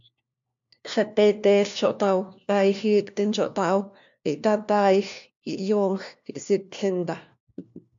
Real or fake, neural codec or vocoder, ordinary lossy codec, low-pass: fake; codec, 16 kHz, 1 kbps, FunCodec, trained on LibriTTS, 50 frames a second; MP3, 64 kbps; 7.2 kHz